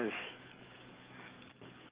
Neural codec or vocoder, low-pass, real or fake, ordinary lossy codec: codec, 44.1 kHz, 7.8 kbps, DAC; 3.6 kHz; fake; Opus, 32 kbps